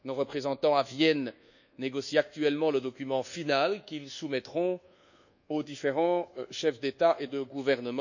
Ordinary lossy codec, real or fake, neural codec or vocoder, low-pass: none; fake; codec, 24 kHz, 1.2 kbps, DualCodec; 7.2 kHz